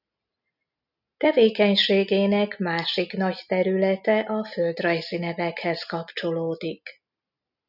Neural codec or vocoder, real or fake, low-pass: none; real; 5.4 kHz